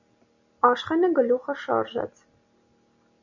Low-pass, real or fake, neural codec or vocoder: 7.2 kHz; real; none